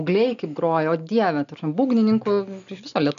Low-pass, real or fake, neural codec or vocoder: 7.2 kHz; real; none